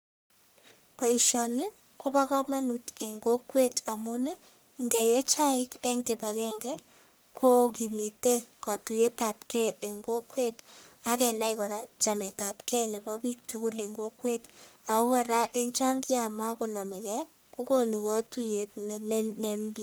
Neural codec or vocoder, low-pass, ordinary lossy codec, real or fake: codec, 44.1 kHz, 1.7 kbps, Pupu-Codec; none; none; fake